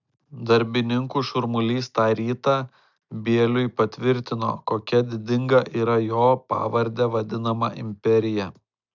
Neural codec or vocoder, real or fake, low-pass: none; real; 7.2 kHz